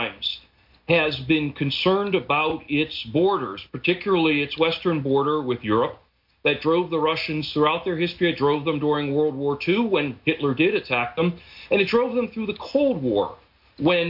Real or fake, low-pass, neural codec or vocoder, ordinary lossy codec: real; 5.4 kHz; none; MP3, 48 kbps